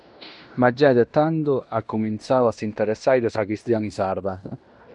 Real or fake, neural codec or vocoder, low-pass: fake; codec, 16 kHz in and 24 kHz out, 0.9 kbps, LongCat-Audio-Codec, fine tuned four codebook decoder; 10.8 kHz